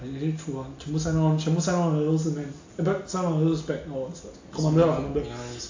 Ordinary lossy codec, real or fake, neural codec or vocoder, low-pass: AAC, 48 kbps; real; none; 7.2 kHz